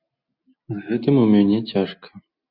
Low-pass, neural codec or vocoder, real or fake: 5.4 kHz; none; real